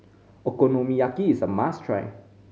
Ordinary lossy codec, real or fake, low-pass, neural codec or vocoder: none; real; none; none